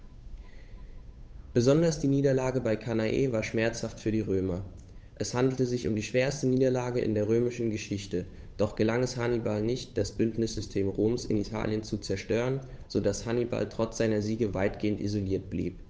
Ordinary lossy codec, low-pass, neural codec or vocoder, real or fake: none; none; codec, 16 kHz, 8 kbps, FunCodec, trained on Chinese and English, 25 frames a second; fake